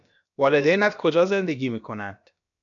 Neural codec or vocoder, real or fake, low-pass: codec, 16 kHz, about 1 kbps, DyCAST, with the encoder's durations; fake; 7.2 kHz